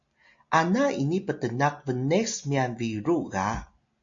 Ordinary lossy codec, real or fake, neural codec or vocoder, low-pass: MP3, 48 kbps; real; none; 7.2 kHz